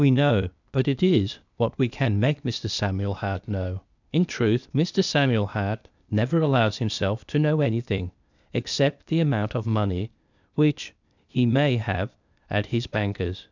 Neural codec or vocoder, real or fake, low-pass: codec, 16 kHz, 0.8 kbps, ZipCodec; fake; 7.2 kHz